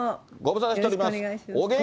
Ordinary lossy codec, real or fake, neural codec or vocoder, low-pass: none; real; none; none